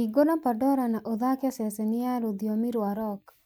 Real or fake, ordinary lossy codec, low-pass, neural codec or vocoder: real; none; none; none